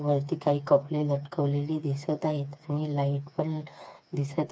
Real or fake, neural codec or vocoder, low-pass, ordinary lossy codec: fake; codec, 16 kHz, 4 kbps, FreqCodec, smaller model; none; none